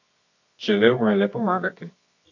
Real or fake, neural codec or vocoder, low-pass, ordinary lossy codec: fake; codec, 24 kHz, 0.9 kbps, WavTokenizer, medium music audio release; 7.2 kHz; AAC, 48 kbps